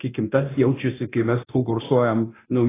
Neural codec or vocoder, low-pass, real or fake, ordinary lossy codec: codec, 24 kHz, 0.9 kbps, DualCodec; 3.6 kHz; fake; AAC, 16 kbps